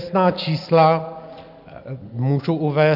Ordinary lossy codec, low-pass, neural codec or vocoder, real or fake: AAC, 48 kbps; 5.4 kHz; none; real